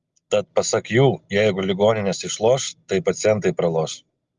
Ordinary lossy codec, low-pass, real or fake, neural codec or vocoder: Opus, 32 kbps; 7.2 kHz; real; none